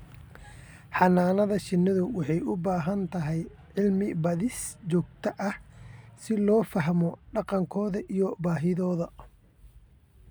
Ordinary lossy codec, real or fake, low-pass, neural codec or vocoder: none; real; none; none